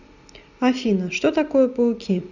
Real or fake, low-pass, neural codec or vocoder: real; 7.2 kHz; none